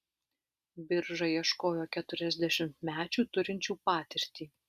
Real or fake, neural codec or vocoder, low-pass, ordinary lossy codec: real; none; 14.4 kHz; Opus, 64 kbps